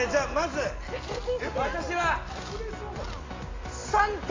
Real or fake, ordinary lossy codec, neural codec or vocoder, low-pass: real; AAC, 32 kbps; none; 7.2 kHz